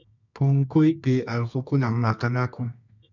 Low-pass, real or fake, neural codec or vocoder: 7.2 kHz; fake; codec, 24 kHz, 0.9 kbps, WavTokenizer, medium music audio release